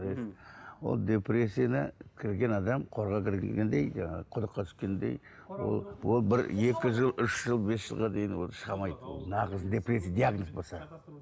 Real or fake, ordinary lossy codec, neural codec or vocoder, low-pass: real; none; none; none